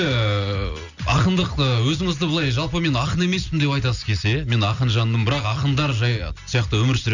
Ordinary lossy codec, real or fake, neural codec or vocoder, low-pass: none; real; none; 7.2 kHz